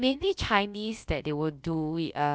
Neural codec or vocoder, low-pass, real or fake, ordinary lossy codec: codec, 16 kHz, about 1 kbps, DyCAST, with the encoder's durations; none; fake; none